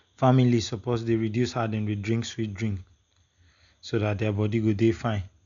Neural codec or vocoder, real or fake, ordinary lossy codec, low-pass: none; real; none; 7.2 kHz